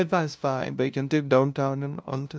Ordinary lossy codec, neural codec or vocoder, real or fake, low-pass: none; codec, 16 kHz, 0.5 kbps, FunCodec, trained on LibriTTS, 25 frames a second; fake; none